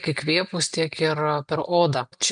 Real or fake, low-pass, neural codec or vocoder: real; 9.9 kHz; none